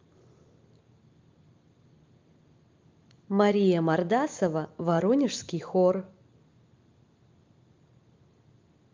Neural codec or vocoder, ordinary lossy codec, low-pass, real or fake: none; Opus, 24 kbps; 7.2 kHz; real